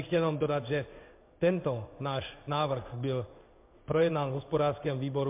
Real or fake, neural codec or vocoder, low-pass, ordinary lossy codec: fake; codec, 16 kHz in and 24 kHz out, 1 kbps, XY-Tokenizer; 3.6 kHz; MP3, 32 kbps